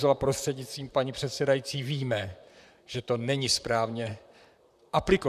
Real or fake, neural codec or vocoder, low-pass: fake; vocoder, 44.1 kHz, 128 mel bands, Pupu-Vocoder; 14.4 kHz